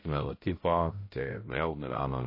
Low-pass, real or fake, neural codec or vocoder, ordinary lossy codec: 5.4 kHz; fake; codec, 16 kHz, 0.5 kbps, X-Codec, HuBERT features, trained on balanced general audio; MP3, 24 kbps